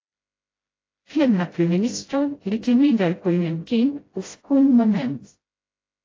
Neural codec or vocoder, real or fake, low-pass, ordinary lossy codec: codec, 16 kHz, 0.5 kbps, FreqCodec, smaller model; fake; 7.2 kHz; AAC, 32 kbps